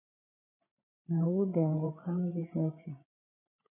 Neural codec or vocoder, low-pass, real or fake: codec, 16 kHz, 8 kbps, FreqCodec, larger model; 3.6 kHz; fake